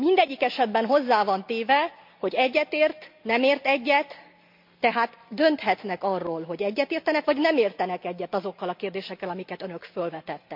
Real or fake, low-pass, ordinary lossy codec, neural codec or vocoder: real; 5.4 kHz; MP3, 48 kbps; none